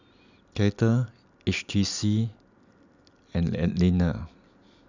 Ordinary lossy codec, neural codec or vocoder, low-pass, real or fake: none; none; 7.2 kHz; real